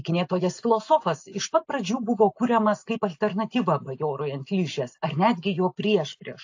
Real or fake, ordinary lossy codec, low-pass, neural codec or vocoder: real; AAC, 48 kbps; 7.2 kHz; none